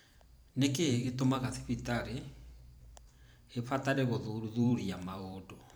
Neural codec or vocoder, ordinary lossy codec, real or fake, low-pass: vocoder, 44.1 kHz, 128 mel bands every 512 samples, BigVGAN v2; none; fake; none